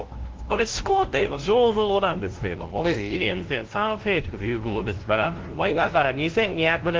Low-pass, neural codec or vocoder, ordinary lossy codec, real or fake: 7.2 kHz; codec, 16 kHz, 0.5 kbps, FunCodec, trained on LibriTTS, 25 frames a second; Opus, 16 kbps; fake